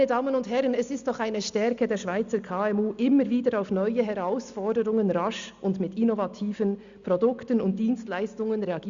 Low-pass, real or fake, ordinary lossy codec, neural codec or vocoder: 7.2 kHz; real; Opus, 64 kbps; none